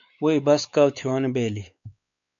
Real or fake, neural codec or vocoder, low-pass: fake; codec, 16 kHz, 4 kbps, X-Codec, WavLM features, trained on Multilingual LibriSpeech; 7.2 kHz